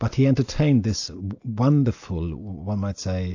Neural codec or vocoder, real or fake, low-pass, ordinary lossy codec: none; real; 7.2 kHz; AAC, 48 kbps